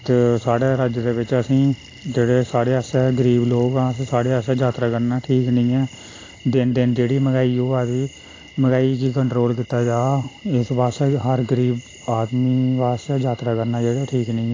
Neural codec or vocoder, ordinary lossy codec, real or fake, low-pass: none; AAC, 32 kbps; real; 7.2 kHz